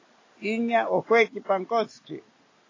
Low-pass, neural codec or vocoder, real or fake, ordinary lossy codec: 7.2 kHz; none; real; AAC, 32 kbps